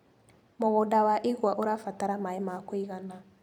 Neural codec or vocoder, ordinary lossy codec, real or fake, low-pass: vocoder, 44.1 kHz, 128 mel bands every 256 samples, BigVGAN v2; none; fake; 19.8 kHz